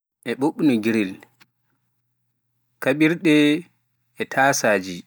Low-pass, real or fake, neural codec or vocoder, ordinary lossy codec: none; real; none; none